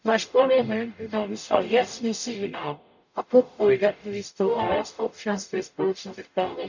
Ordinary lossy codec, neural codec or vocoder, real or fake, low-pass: none; codec, 44.1 kHz, 0.9 kbps, DAC; fake; 7.2 kHz